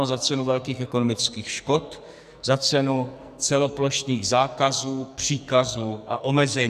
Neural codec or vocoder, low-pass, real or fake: codec, 44.1 kHz, 2.6 kbps, SNAC; 14.4 kHz; fake